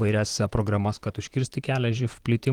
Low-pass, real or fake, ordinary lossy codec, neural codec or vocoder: 19.8 kHz; fake; Opus, 24 kbps; vocoder, 44.1 kHz, 128 mel bands, Pupu-Vocoder